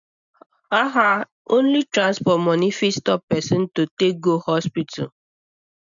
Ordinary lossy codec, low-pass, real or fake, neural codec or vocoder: none; 7.2 kHz; real; none